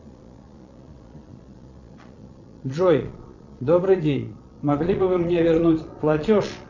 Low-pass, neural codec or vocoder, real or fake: 7.2 kHz; vocoder, 22.05 kHz, 80 mel bands, Vocos; fake